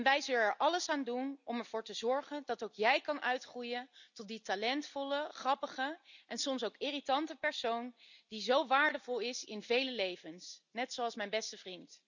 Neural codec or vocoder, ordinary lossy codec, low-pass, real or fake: none; none; 7.2 kHz; real